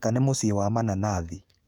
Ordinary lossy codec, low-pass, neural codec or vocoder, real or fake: none; 19.8 kHz; codec, 44.1 kHz, 7.8 kbps, DAC; fake